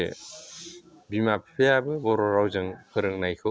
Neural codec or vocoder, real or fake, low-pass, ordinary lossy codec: none; real; none; none